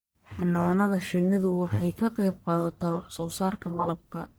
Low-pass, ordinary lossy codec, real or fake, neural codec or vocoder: none; none; fake; codec, 44.1 kHz, 1.7 kbps, Pupu-Codec